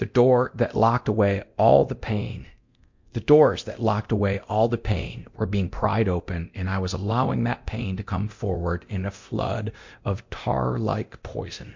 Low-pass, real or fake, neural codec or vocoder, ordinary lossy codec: 7.2 kHz; fake; codec, 24 kHz, 0.9 kbps, DualCodec; MP3, 48 kbps